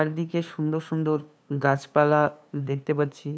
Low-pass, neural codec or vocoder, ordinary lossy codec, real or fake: none; codec, 16 kHz, 2 kbps, FunCodec, trained on LibriTTS, 25 frames a second; none; fake